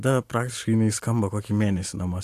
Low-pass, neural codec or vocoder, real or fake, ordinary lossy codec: 14.4 kHz; none; real; AAC, 64 kbps